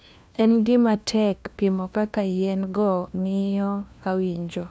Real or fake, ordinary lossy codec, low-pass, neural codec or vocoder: fake; none; none; codec, 16 kHz, 1 kbps, FunCodec, trained on LibriTTS, 50 frames a second